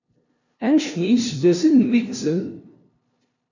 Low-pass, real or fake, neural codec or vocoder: 7.2 kHz; fake; codec, 16 kHz, 0.5 kbps, FunCodec, trained on LibriTTS, 25 frames a second